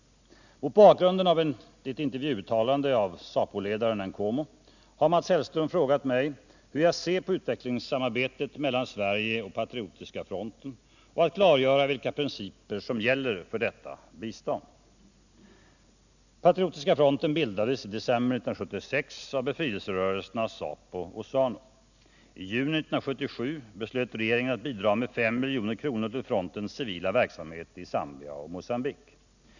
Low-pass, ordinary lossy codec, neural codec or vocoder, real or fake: 7.2 kHz; none; none; real